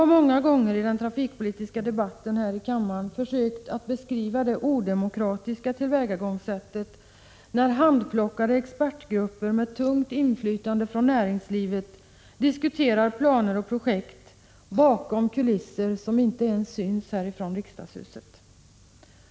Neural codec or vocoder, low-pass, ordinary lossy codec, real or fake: none; none; none; real